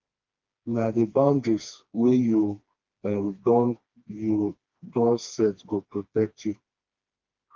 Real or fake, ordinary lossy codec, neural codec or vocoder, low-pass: fake; Opus, 32 kbps; codec, 16 kHz, 2 kbps, FreqCodec, smaller model; 7.2 kHz